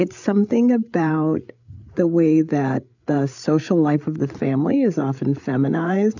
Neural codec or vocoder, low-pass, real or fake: codec, 16 kHz, 8 kbps, FreqCodec, larger model; 7.2 kHz; fake